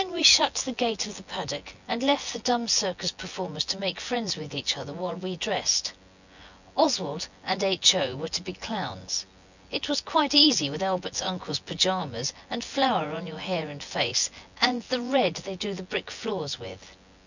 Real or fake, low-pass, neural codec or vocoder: fake; 7.2 kHz; vocoder, 24 kHz, 100 mel bands, Vocos